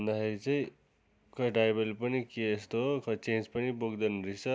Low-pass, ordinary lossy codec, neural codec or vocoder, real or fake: none; none; none; real